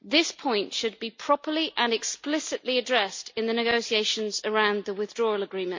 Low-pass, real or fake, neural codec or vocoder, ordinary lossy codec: 7.2 kHz; real; none; MP3, 48 kbps